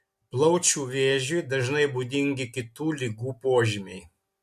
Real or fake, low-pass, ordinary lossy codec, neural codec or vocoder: real; 14.4 kHz; MP3, 64 kbps; none